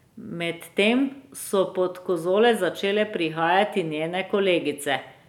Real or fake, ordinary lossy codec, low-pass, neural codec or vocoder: real; none; 19.8 kHz; none